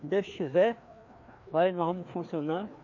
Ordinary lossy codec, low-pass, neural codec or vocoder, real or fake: MP3, 48 kbps; 7.2 kHz; codec, 16 kHz, 2 kbps, FreqCodec, larger model; fake